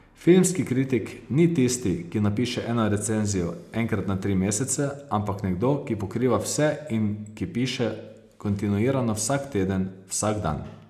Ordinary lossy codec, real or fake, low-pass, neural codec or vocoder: none; real; 14.4 kHz; none